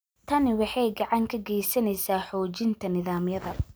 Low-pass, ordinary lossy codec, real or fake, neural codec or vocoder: none; none; real; none